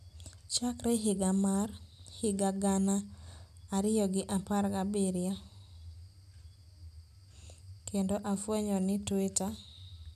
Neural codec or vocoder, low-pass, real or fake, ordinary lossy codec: none; 14.4 kHz; real; none